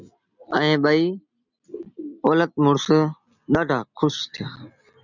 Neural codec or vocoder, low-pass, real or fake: none; 7.2 kHz; real